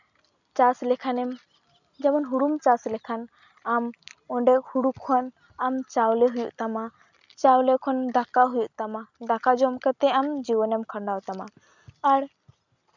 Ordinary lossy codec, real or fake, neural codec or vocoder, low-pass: none; real; none; 7.2 kHz